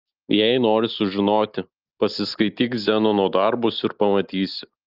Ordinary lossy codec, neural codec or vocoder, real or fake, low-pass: Opus, 32 kbps; none; real; 5.4 kHz